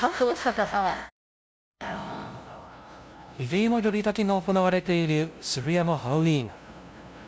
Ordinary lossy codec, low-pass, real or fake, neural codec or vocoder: none; none; fake; codec, 16 kHz, 0.5 kbps, FunCodec, trained on LibriTTS, 25 frames a second